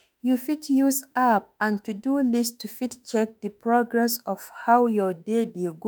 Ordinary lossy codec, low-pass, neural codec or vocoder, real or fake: none; none; autoencoder, 48 kHz, 32 numbers a frame, DAC-VAE, trained on Japanese speech; fake